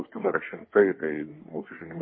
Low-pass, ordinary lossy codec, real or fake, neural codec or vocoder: 7.2 kHz; MP3, 24 kbps; fake; codec, 24 kHz, 1 kbps, SNAC